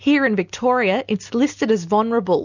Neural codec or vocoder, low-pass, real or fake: none; 7.2 kHz; real